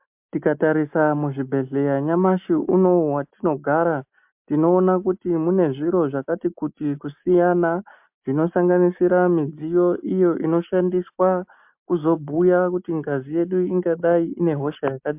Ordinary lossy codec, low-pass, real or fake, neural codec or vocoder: MP3, 32 kbps; 3.6 kHz; real; none